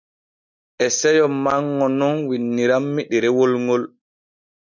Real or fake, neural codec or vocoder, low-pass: real; none; 7.2 kHz